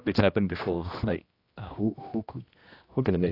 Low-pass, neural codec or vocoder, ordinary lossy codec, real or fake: 5.4 kHz; codec, 16 kHz, 1 kbps, X-Codec, HuBERT features, trained on general audio; AAC, 24 kbps; fake